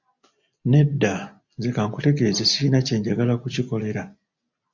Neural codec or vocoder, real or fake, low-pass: none; real; 7.2 kHz